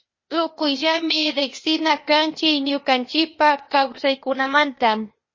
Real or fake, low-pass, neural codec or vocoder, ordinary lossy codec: fake; 7.2 kHz; codec, 16 kHz, 0.8 kbps, ZipCodec; MP3, 32 kbps